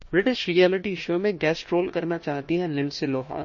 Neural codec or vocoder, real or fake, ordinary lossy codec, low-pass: codec, 16 kHz, 1 kbps, FunCodec, trained on Chinese and English, 50 frames a second; fake; MP3, 32 kbps; 7.2 kHz